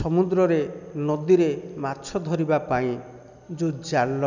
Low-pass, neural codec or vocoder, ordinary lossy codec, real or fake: 7.2 kHz; none; none; real